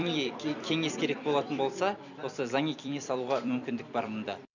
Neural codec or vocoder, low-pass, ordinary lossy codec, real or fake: vocoder, 44.1 kHz, 128 mel bands every 256 samples, BigVGAN v2; 7.2 kHz; none; fake